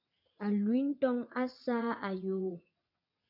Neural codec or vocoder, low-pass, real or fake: vocoder, 22.05 kHz, 80 mel bands, WaveNeXt; 5.4 kHz; fake